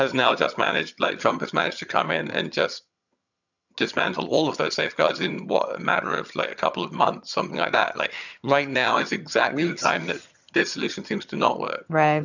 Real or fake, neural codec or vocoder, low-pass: fake; vocoder, 22.05 kHz, 80 mel bands, HiFi-GAN; 7.2 kHz